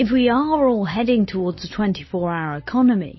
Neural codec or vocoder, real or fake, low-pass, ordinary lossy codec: none; real; 7.2 kHz; MP3, 24 kbps